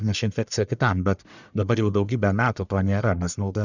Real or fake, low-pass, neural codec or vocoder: fake; 7.2 kHz; codec, 44.1 kHz, 1.7 kbps, Pupu-Codec